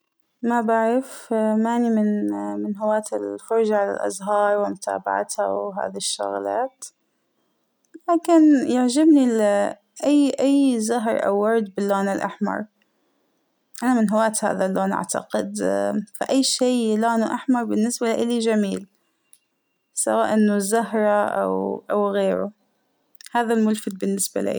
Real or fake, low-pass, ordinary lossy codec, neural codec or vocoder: real; none; none; none